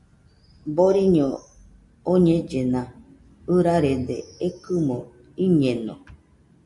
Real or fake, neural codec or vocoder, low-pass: real; none; 10.8 kHz